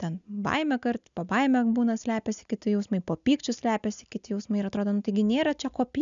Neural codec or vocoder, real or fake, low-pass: none; real; 7.2 kHz